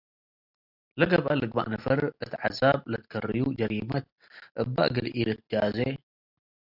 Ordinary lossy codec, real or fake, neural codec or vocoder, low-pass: AAC, 48 kbps; real; none; 5.4 kHz